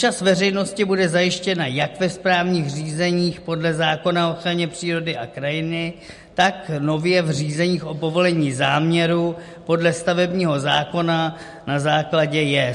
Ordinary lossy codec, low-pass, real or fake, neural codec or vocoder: MP3, 48 kbps; 14.4 kHz; real; none